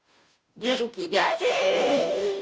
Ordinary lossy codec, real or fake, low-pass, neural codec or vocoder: none; fake; none; codec, 16 kHz, 0.5 kbps, FunCodec, trained on Chinese and English, 25 frames a second